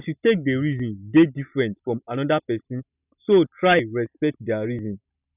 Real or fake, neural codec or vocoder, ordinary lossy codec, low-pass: real; none; none; 3.6 kHz